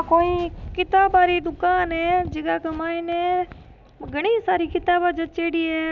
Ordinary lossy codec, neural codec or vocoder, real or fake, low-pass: none; none; real; 7.2 kHz